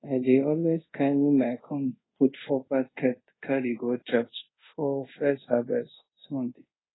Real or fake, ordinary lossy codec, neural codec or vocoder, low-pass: fake; AAC, 16 kbps; codec, 24 kHz, 0.5 kbps, DualCodec; 7.2 kHz